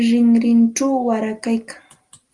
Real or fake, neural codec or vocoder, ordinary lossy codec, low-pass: real; none; Opus, 32 kbps; 10.8 kHz